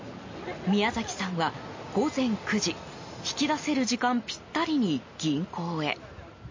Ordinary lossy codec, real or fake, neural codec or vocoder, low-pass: MP3, 32 kbps; real; none; 7.2 kHz